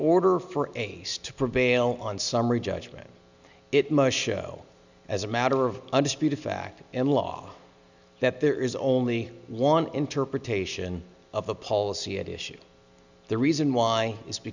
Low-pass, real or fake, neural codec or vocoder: 7.2 kHz; real; none